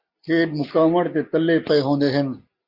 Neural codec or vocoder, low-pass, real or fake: none; 5.4 kHz; real